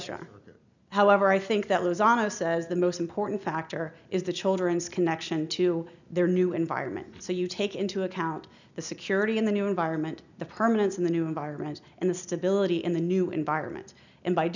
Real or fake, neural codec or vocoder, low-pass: real; none; 7.2 kHz